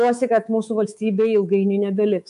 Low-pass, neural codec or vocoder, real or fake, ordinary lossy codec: 10.8 kHz; codec, 24 kHz, 3.1 kbps, DualCodec; fake; MP3, 64 kbps